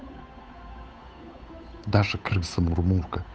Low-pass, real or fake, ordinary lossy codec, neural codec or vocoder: none; fake; none; codec, 16 kHz, 8 kbps, FunCodec, trained on Chinese and English, 25 frames a second